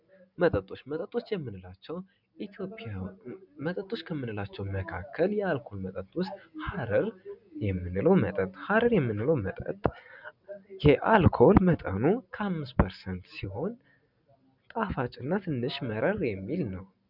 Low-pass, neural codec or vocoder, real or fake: 5.4 kHz; none; real